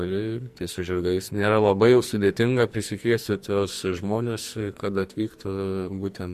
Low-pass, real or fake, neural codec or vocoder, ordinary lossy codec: 14.4 kHz; fake; codec, 44.1 kHz, 2.6 kbps, SNAC; MP3, 64 kbps